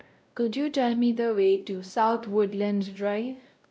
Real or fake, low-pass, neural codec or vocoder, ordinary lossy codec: fake; none; codec, 16 kHz, 0.5 kbps, X-Codec, WavLM features, trained on Multilingual LibriSpeech; none